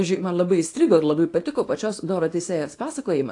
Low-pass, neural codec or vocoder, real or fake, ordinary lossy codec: 10.8 kHz; codec, 24 kHz, 0.9 kbps, WavTokenizer, small release; fake; AAC, 48 kbps